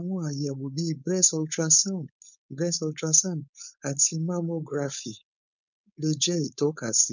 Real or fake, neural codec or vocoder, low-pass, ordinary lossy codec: fake; codec, 16 kHz, 4.8 kbps, FACodec; 7.2 kHz; none